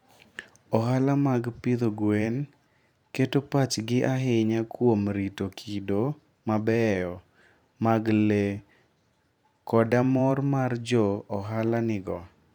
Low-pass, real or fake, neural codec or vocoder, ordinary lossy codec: 19.8 kHz; fake; vocoder, 44.1 kHz, 128 mel bands every 512 samples, BigVGAN v2; none